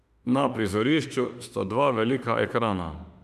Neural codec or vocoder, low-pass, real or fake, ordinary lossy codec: autoencoder, 48 kHz, 32 numbers a frame, DAC-VAE, trained on Japanese speech; 14.4 kHz; fake; none